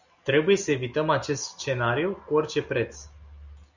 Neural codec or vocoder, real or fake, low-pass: none; real; 7.2 kHz